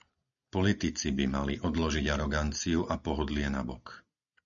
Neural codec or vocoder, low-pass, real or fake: none; 7.2 kHz; real